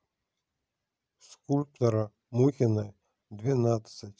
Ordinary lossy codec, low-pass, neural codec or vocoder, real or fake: none; none; none; real